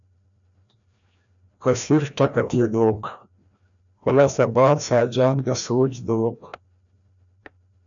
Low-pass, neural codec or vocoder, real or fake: 7.2 kHz; codec, 16 kHz, 1 kbps, FreqCodec, larger model; fake